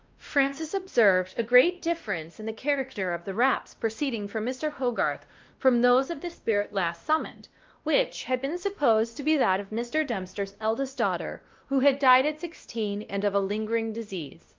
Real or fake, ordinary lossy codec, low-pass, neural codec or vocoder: fake; Opus, 32 kbps; 7.2 kHz; codec, 16 kHz, 1 kbps, X-Codec, WavLM features, trained on Multilingual LibriSpeech